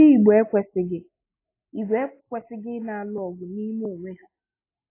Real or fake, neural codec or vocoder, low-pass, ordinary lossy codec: real; none; 3.6 kHz; AAC, 24 kbps